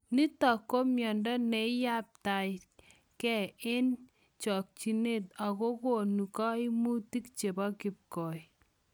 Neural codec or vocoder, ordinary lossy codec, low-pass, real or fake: none; none; none; real